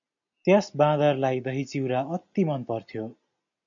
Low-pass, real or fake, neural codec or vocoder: 7.2 kHz; real; none